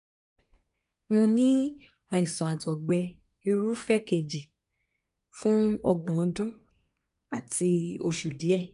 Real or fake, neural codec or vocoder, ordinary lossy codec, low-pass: fake; codec, 24 kHz, 1 kbps, SNAC; none; 10.8 kHz